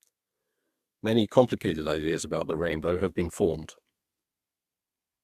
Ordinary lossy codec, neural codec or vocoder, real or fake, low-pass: Opus, 64 kbps; codec, 32 kHz, 1.9 kbps, SNAC; fake; 14.4 kHz